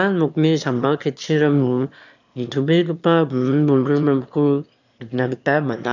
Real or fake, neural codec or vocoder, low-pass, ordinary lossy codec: fake; autoencoder, 22.05 kHz, a latent of 192 numbers a frame, VITS, trained on one speaker; 7.2 kHz; none